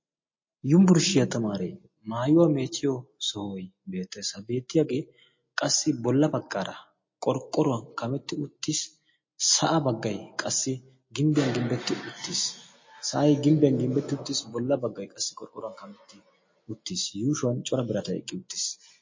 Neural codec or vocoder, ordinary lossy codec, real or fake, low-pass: none; MP3, 32 kbps; real; 7.2 kHz